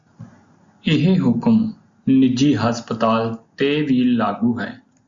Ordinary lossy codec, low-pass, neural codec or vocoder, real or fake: Opus, 64 kbps; 7.2 kHz; none; real